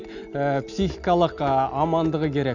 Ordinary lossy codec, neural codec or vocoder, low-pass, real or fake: none; none; 7.2 kHz; real